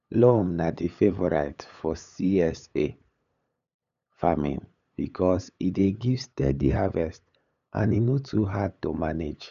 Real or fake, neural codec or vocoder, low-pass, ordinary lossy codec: fake; codec, 16 kHz, 8 kbps, FunCodec, trained on LibriTTS, 25 frames a second; 7.2 kHz; none